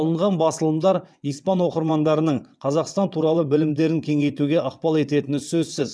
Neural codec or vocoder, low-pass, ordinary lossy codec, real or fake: vocoder, 22.05 kHz, 80 mel bands, WaveNeXt; none; none; fake